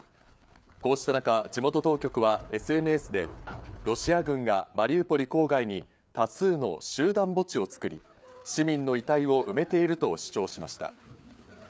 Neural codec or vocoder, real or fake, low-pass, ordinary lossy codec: codec, 16 kHz, 4 kbps, FreqCodec, larger model; fake; none; none